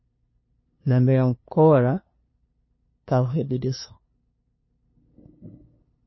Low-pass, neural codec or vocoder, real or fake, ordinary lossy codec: 7.2 kHz; codec, 16 kHz, 2 kbps, FunCodec, trained on LibriTTS, 25 frames a second; fake; MP3, 24 kbps